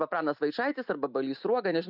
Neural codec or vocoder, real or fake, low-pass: none; real; 5.4 kHz